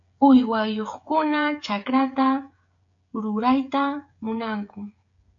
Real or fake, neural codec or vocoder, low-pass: fake; codec, 16 kHz, 16 kbps, FreqCodec, smaller model; 7.2 kHz